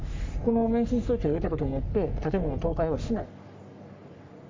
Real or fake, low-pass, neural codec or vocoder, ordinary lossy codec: fake; 7.2 kHz; codec, 44.1 kHz, 3.4 kbps, Pupu-Codec; MP3, 64 kbps